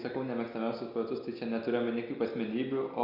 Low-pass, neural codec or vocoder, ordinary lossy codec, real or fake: 5.4 kHz; none; Opus, 64 kbps; real